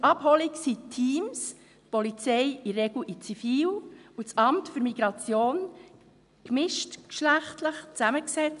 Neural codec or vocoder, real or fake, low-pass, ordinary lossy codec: none; real; 10.8 kHz; none